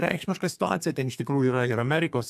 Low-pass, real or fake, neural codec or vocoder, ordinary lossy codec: 14.4 kHz; fake; codec, 32 kHz, 1.9 kbps, SNAC; AAC, 96 kbps